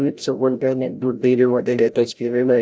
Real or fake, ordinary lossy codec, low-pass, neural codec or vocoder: fake; none; none; codec, 16 kHz, 0.5 kbps, FreqCodec, larger model